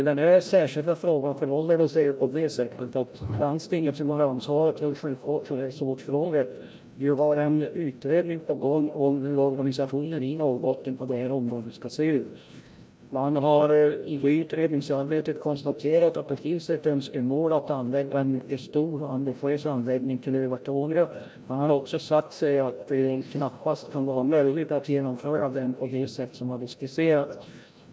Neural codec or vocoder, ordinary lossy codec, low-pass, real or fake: codec, 16 kHz, 0.5 kbps, FreqCodec, larger model; none; none; fake